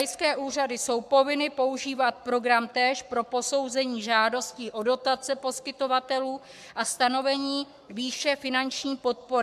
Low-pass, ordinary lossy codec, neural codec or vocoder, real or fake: 14.4 kHz; MP3, 96 kbps; codec, 44.1 kHz, 7.8 kbps, Pupu-Codec; fake